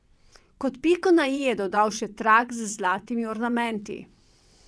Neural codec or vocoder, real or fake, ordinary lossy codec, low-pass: vocoder, 22.05 kHz, 80 mel bands, WaveNeXt; fake; none; none